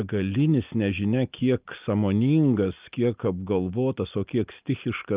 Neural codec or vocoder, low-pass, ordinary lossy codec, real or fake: none; 3.6 kHz; Opus, 32 kbps; real